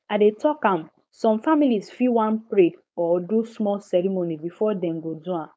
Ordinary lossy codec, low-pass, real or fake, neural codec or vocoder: none; none; fake; codec, 16 kHz, 4.8 kbps, FACodec